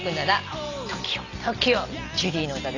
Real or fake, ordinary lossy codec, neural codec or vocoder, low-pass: real; none; none; 7.2 kHz